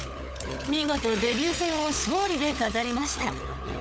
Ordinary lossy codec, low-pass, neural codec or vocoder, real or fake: none; none; codec, 16 kHz, 16 kbps, FunCodec, trained on LibriTTS, 50 frames a second; fake